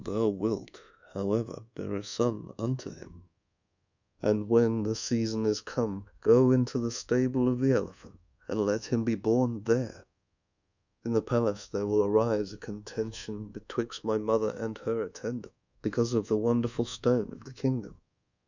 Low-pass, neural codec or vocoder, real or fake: 7.2 kHz; codec, 24 kHz, 1.2 kbps, DualCodec; fake